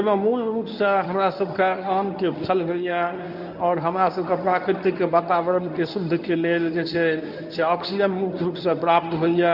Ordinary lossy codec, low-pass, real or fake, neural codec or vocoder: none; 5.4 kHz; fake; codec, 24 kHz, 0.9 kbps, WavTokenizer, medium speech release version 1